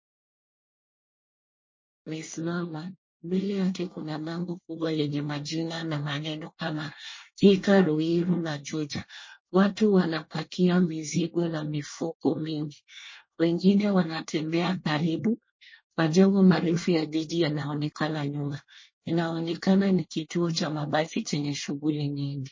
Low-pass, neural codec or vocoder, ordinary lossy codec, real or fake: 7.2 kHz; codec, 24 kHz, 1 kbps, SNAC; MP3, 32 kbps; fake